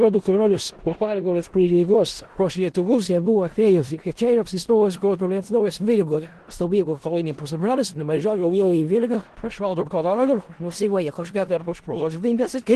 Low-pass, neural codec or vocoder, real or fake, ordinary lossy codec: 10.8 kHz; codec, 16 kHz in and 24 kHz out, 0.4 kbps, LongCat-Audio-Codec, four codebook decoder; fake; Opus, 16 kbps